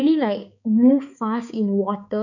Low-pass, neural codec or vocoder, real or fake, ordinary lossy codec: 7.2 kHz; codec, 16 kHz, 4 kbps, X-Codec, HuBERT features, trained on balanced general audio; fake; none